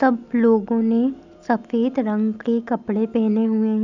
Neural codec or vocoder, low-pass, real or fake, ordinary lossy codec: none; 7.2 kHz; real; none